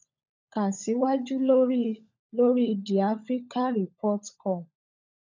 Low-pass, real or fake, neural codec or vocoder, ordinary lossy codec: 7.2 kHz; fake; codec, 16 kHz, 16 kbps, FunCodec, trained on LibriTTS, 50 frames a second; none